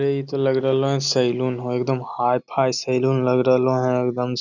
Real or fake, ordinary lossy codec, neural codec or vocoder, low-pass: real; none; none; 7.2 kHz